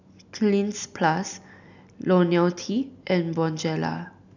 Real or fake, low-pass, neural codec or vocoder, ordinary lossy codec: real; 7.2 kHz; none; none